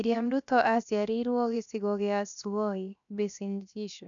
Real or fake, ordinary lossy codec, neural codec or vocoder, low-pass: fake; none; codec, 16 kHz, 0.7 kbps, FocalCodec; 7.2 kHz